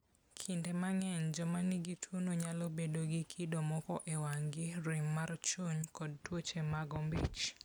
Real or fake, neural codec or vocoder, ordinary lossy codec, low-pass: real; none; none; none